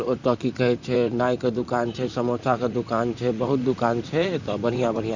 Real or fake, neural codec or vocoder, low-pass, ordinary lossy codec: fake; vocoder, 44.1 kHz, 128 mel bands, Pupu-Vocoder; 7.2 kHz; none